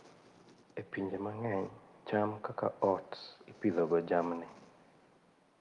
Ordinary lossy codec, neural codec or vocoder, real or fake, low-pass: Opus, 32 kbps; none; real; 10.8 kHz